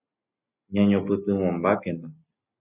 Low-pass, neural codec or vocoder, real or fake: 3.6 kHz; none; real